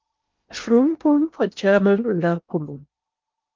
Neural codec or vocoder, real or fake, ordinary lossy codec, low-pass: codec, 16 kHz in and 24 kHz out, 0.8 kbps, FocalCodec, streaming, 65536 codes; fake; Opus, 24 kbps; 7.2 kHz